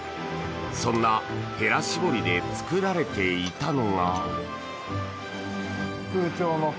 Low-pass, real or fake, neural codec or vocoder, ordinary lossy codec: none; real; none; none